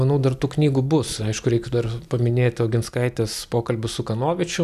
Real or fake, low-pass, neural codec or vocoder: real; 14.4 kHz; none